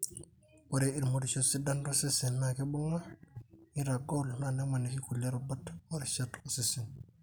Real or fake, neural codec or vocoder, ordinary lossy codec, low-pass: real; none; none; none